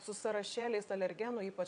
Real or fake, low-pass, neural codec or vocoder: fake; 9.9 kHz; vocoder, 22.05 kHz, 80 mel bands, WaveNeXt